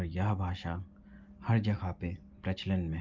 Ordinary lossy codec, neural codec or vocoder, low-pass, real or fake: Opus, 24 kbps; none; 7.2 kHz; real